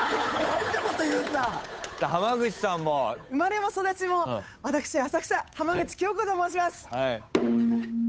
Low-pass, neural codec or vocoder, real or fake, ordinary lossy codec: none; codec, 16 kHz, 8 kbps, FunCodec, trained on Chinese and English, 25 frames a second; fake; none